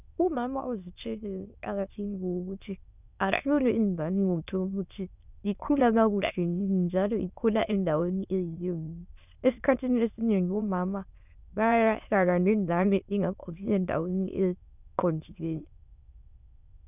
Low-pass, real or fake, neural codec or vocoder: 3.6 kHz; fake; autoencoder, 22.05 kHz, a latent of 192 numbers a frame, VITS, trained on many speakers